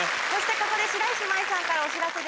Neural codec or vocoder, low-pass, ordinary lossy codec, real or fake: none; none; none; real